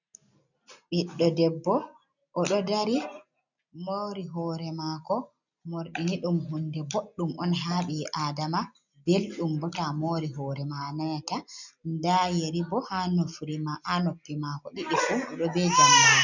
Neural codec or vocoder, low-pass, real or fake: none; 7.2 kHz; real